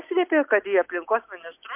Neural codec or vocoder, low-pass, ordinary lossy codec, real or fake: none; 3.6 kHz; MP3, 32 kbps; real